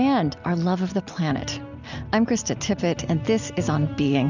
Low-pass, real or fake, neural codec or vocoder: 7.2 kHz; real; none